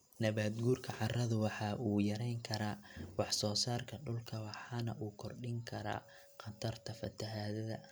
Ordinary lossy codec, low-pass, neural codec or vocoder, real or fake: none; none; none; real